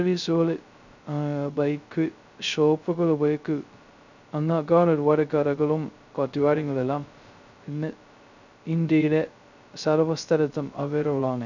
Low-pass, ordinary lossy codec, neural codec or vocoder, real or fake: 7.2 kHz; none; codec, 16 kHz, 0.2 kbps, FocalCodec; fake